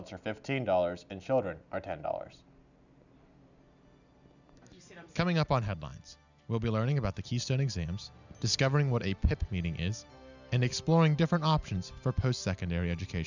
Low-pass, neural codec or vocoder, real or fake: 7.2 kHz; none; real